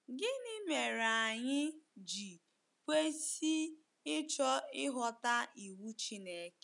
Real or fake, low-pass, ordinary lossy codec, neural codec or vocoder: real; 10.8 kHz; none; none